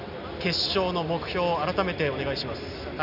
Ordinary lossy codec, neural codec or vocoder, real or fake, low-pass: none; none; real; 5.4 kHz